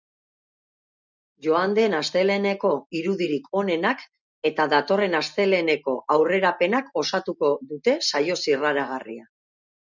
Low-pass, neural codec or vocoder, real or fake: 7.2 kHz; none; real